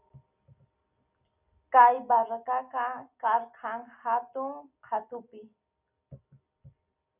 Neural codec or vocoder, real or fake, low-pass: none; real; 3.6 kHz